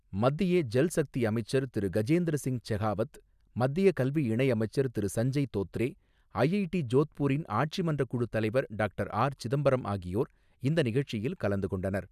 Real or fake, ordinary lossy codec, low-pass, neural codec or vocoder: real; none; 14.4 kHz; none